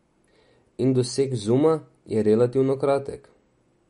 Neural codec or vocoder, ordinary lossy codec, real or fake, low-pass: none; MP3, 48 kbps; real; 19.8 kHz